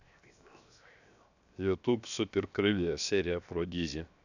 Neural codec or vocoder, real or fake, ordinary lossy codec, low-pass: codec, 16 kHz, 0.7 kbps, FocalCodec; fake; none; 7.2 kHz